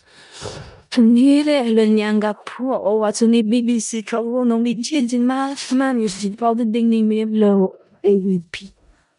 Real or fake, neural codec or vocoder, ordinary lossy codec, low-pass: fake; codec, 16 kHz in and 24 kHz out, 0.4 kbps, LongCat-Audio-Codec, four codebook decoder; none; 10.8 kHz